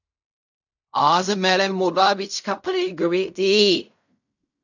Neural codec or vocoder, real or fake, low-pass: codec, 16 kHz in and 24 kHz out, 0.4 kbps, LongCat-Audio-Codec, fine tuned four codebook decoder; fake; 7.2 kHz